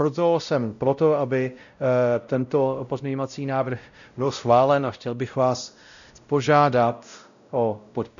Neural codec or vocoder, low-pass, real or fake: codec, 16 kHz, 0.5 kbps, X-Codec, WavLM features, trained on Multilingual LibriSpeech; 7.2 kHz; fake